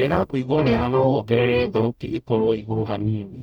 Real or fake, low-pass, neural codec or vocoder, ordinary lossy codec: fake; 19.8 kHz; codec, 44.1 kHz, 0.9 kbps, DAC; none